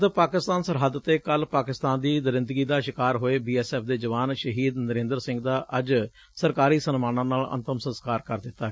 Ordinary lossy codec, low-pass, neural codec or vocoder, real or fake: none; none; none; real